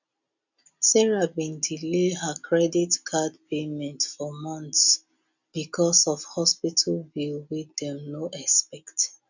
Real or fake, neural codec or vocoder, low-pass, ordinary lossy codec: real; none; 7.2 kHz; none